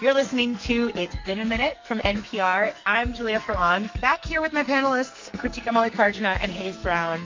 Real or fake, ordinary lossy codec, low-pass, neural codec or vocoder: fake; MP3, 48 kbps; 7.2 kHz; codec, 44.1 kHz, 2.6 kbps, SNAC